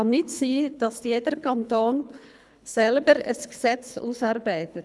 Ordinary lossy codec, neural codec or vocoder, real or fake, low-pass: none; codec, 24 kHz, 3 kbps, HILCodec; fake; none